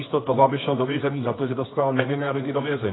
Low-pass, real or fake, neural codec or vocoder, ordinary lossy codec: 7.2 kHz; fake; codec, 24 kHz, 0.9 kbps, WavTokenizer, medium music audio release; AAC, 16 kbps